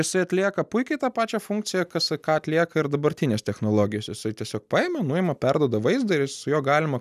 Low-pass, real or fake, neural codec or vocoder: 14.4 kHz; real; none